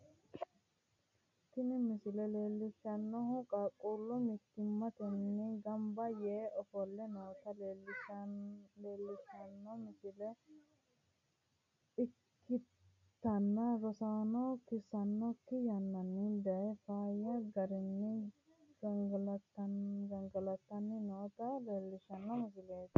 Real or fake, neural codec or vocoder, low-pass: real; none; 7.2 kHz